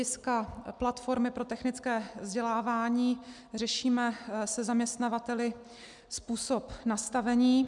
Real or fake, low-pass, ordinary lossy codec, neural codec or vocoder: real; 10.8 kHz; MP3, 96 kbps; none